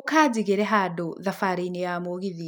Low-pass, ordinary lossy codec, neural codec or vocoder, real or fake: none; none; none; real